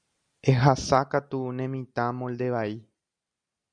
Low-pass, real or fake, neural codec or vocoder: 9.9 kHz; real; none